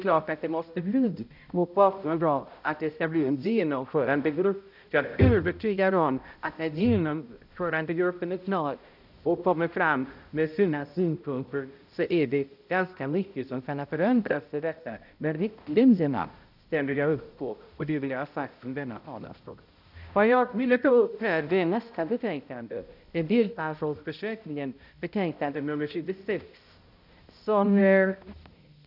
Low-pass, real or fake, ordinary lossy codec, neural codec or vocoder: 5.4 kHz; fake; none; codec, 16 kHz, 0.5 kbps, X-Codec, HuBERT features, trained on balanced general audio